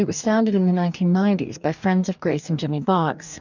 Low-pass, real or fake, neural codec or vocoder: 7.2 kHz; fake; codec, 44.1 kHz, 2.6 kbps, DAC